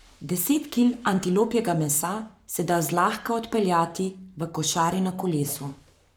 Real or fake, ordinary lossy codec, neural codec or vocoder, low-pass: fake; none; codec, 44.1 kHz, 7.8 kbps, Pupu-Codec; none